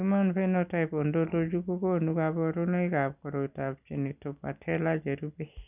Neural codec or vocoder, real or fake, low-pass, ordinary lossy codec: none; real; 3.6 kHz; none